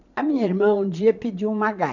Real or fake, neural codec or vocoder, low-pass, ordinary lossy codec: fake; vocoder, 22.05 kHz, 80 mel bands, Vocos; 7.2 kHz; none